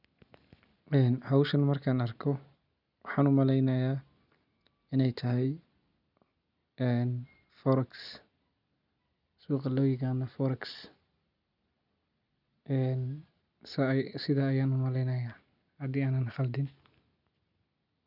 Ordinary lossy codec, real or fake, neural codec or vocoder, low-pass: none; real; none; 5.4 kHz